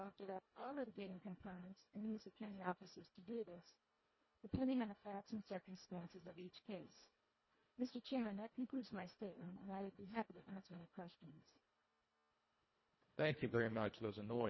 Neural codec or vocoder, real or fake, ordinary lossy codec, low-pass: codec, 24 kHz, 1.5 kbps, HILCodec; fake; MP3, 24 kbps; 7.2 kHz